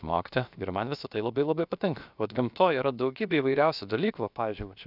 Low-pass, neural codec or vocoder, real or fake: 5.4 kHz; codec, 16 kHz, about 1 kbps, DyCAST, with the encoder's durations; fake